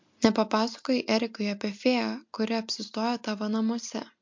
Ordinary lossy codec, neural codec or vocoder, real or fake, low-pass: MP3, 48 kbps; none; real; 7.2 kHz